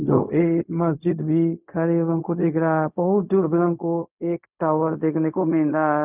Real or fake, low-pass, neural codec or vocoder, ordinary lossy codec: fake; 3.6 kHz; codec, 16 kHz, 0.4 kbps, LongCat-Audio-Codec; none